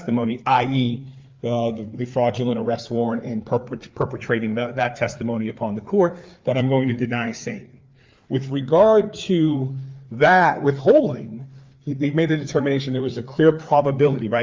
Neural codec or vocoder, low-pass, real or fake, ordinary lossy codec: codec, 16 kHz, 4 kbps, FreqCodec, larger model; 7.2 kHz; fake; Opus, 24 kbps